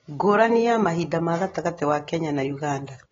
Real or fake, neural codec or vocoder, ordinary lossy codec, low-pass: real; none; AAC, 24 kbps; 7.2 kHz